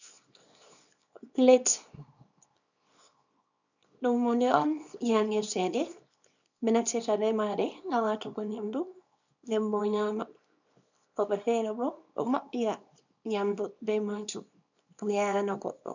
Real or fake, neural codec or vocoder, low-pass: fake; codec, 24 kHz, 0.9 kbps, WavTokenizer, small release; 7.2 kHz